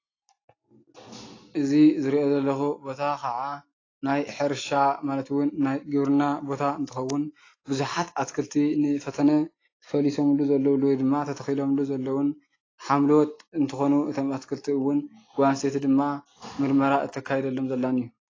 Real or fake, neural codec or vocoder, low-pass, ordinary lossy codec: real; none; 7.2 kHz; AAC, 32 kbps